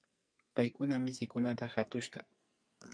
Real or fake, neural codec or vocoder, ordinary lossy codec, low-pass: fake; codec, 44.1 kHz, 2.6 kbps, SNAC; MP3, 64 kbps; 9.9 kHz